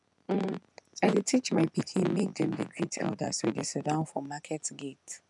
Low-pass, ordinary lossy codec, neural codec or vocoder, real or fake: 10.8 kHz; none; vocoder, 24 kHz, 100 mel bands, Vocos; fake